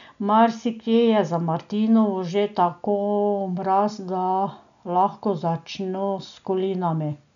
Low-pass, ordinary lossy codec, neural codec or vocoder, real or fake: 7.2 kHz; none; none; real